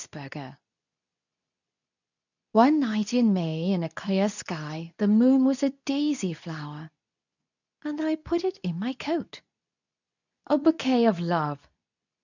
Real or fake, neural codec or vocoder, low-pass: fake; codec, 24 kHz, 0.9 kbps, WavTokenizer, medium speech release version 2; 7.2 kHz